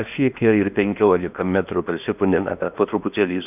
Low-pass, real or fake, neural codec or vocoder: 3.6 kHz; fake; codec, 16 kHz in and 24 kHz out, 0.8 kbps, FocalCodec, streaming, 65536 codes